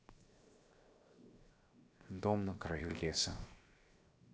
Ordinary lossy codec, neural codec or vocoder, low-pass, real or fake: none; codec, 16 kHz, 0.7 kbps, FocalCodec; none; fake